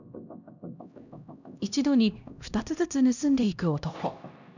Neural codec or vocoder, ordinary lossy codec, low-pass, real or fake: codec, 16 kHz, 1 kbps, X-Codec, HuBERT features, trained on LibriSpeech; none; 7.2 kHz; fake